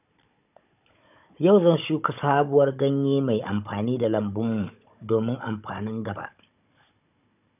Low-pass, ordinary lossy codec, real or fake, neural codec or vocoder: 3.6 kHz; none; fake; codec, 16 kHz, 16 kbps, FunCodec, trained on Chinese and English, 50 frames a second